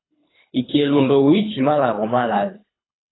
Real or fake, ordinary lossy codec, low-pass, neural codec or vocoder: fake; AAC, 16 kbps; 7.2 kHz; codec, 24 kHz, 3 kbps, HILCodec